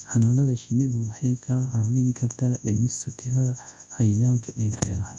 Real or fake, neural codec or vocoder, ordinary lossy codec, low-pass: fake; codec, 24 kHz, 0.9 kbps, WavTokenizer, large speech release; Opus, 64 kbps; 10.8 kHz